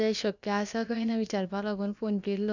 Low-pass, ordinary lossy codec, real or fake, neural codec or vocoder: 7.2 kHz; none; fake; codec, 16 kHz, about 1 kbps, DyCAST, with the encoder's durations